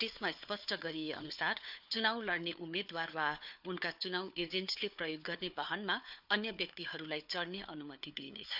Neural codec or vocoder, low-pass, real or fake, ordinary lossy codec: codec, 16 kHz, 4 kbps, FunCodec, trained on Chinese and English, 50 frames a second; 5.4 kHz; fake; none